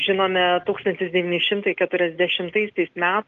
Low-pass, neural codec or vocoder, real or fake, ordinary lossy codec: 7.2 kHz; none; real; Opus, 32 kbps